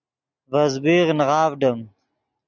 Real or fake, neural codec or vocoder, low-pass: real; none; 7.2 kHz